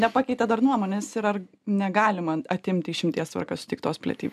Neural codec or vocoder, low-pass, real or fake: none; 14.4 kHz; real